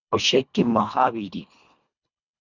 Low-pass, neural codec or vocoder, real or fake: 7.2 kHz; codec, 24 kHz, 1.5 kbps, HILCodec; fake